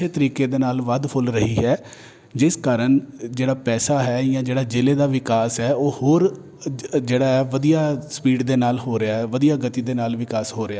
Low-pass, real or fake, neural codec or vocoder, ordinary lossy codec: none; real; none; none